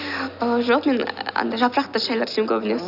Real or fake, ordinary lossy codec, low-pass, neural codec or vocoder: real; none; 5.4 kHz; none